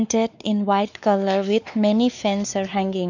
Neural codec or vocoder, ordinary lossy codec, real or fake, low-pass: codec, 16 kHz, 4 kbps, X-Codec, WavLM features, trained on Multilingual LibriSpeech; none; fake; 7.2 kHz